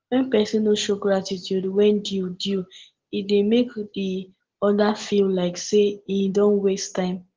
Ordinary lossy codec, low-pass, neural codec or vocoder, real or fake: Opus, 16 kbps; 7.2 kHz; none; real